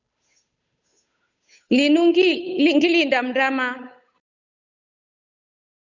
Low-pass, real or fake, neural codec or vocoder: 7.2 kHz; fake; codec, 16 kHz, 8 kbps, FunCodec, trained on Chinese and English, 25 frames a second